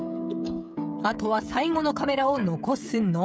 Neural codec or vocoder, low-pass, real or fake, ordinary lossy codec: codec, 16 kHz, 16 kbps, FreqCodec, smaller model; none; fake; none